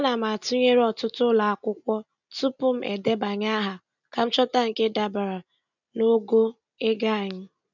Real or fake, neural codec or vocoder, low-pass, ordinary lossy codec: real; none; 7.2 kHz; none